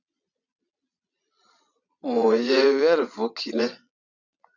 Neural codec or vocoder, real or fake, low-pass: vocoder, 22.05 kHz, 80 mel bands, Vocos; fake; 7.2 kHz